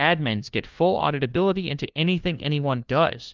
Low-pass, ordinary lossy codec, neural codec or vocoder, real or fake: 7.2 kHz; Opus, 32 kbps; codec, 16 kHz, 1 kbps, FunCodec, trained on LibriTTS, 50 frames a second; fake